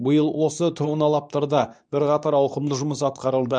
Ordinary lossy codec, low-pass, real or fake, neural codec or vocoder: none; 9.9 kHz; fake; codec, 24 kHz, 0.9 kbps, WavTokenizer, medium speech release version 2